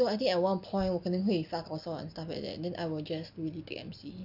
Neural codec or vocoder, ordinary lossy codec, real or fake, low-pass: none; none; real; 5.4 kHz